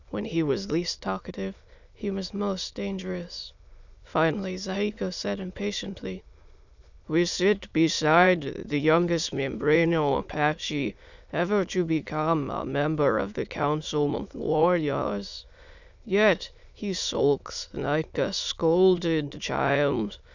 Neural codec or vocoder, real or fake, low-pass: autoencoder, 22.05 kHz, a latent of 192 numbers a frame, VITS, trained on many speakers; fake; 7.2 kHz